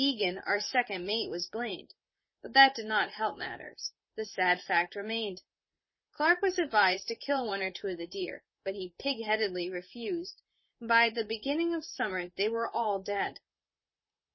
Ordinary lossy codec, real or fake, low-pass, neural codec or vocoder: MP3, 24 kbps; fake; 7.2 kHz; vocoder, 44.1 kHz, 128 mel bands, Pupu-Vocoder